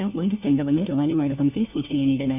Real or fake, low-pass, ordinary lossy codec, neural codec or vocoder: fake; 3.6 kHz; none; codec, 16 kHz, 1 kbps, FunCodec, trained on LibriTTS, 50 frames a second